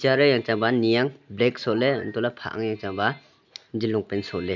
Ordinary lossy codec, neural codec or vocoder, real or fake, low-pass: none; none; real; 7.2 kHz